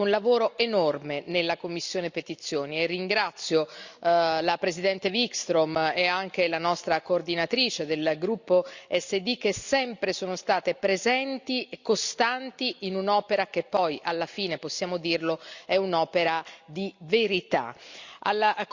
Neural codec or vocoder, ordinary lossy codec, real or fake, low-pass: none; Opus, 64 kbps; real; 7.2 kHz